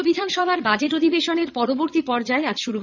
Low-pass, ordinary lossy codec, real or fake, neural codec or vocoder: 7.2 kHz; none; fake; vocoder, 22.05 kHz, 80 mel bands, Vocos